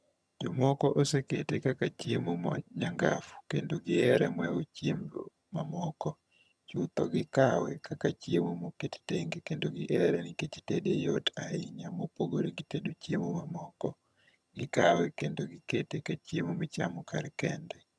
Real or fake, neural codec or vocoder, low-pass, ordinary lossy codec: fake; vocoder, 22.05 kHz, 80 mel bands, HiFi-GAN; none; none